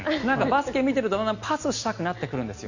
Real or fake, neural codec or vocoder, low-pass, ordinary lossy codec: real; none; 7.2 kHz; Opus, 64 kbps